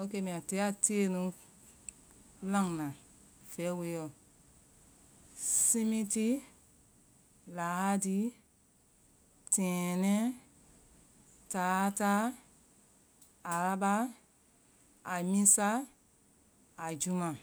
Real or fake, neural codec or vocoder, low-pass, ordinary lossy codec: real; none; none; none